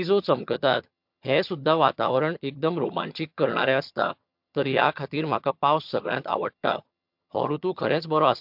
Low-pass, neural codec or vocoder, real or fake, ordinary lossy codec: 5.4 kHz; vocoder, 22.05 kHz, 80 mel bands, HiFi-GAN; fake; MP3, 48 kbps